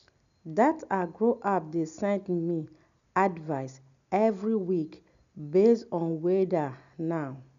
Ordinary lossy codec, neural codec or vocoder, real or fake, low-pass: none; none; real; 7.2 kHz